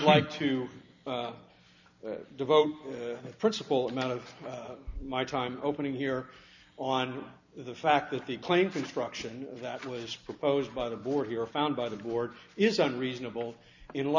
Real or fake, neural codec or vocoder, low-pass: real; none; 7.2 kHz